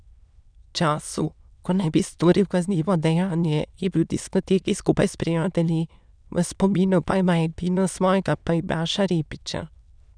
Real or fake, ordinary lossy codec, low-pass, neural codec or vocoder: fake; none; 9.9 kHz; autoencoder, 22.05 kHz, a latent of 192 numbers a frame, VITS, trained on many speakers